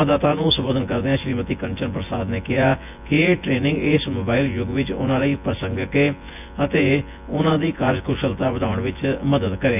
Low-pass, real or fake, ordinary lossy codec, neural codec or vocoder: 3.6 kHz; fake; none; vocoder, 24 kHz, 100 mel bands, Vocos